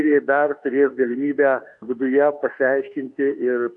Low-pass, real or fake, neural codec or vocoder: 10.8 kHz; fake; autoencoder, 48 kHz, 32 numbers a frame, DAC-VAE, trained on Japanese speech